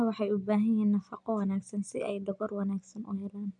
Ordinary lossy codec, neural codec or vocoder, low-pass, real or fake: none; none; 9.9 kHz; real